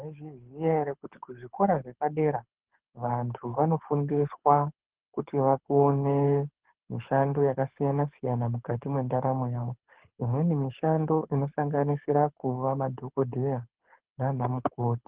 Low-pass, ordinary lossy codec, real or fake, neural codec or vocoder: 3.6 kHz; Opus, 16 kbps; fake; codec, 24 kHz, 6 kbps, HILCodec